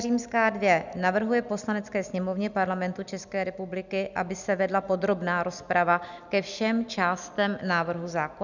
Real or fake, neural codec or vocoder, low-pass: real; none; 7.2 kHz